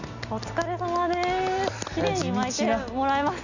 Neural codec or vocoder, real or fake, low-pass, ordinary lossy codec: none; real; 7.2 kHz; none